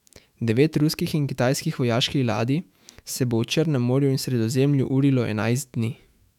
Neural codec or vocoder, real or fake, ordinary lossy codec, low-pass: autoencoder, 48 kHz, 128 numbers a frame, DAC-VAE, trained on Japanese speech; fake; none; 19.8 kHz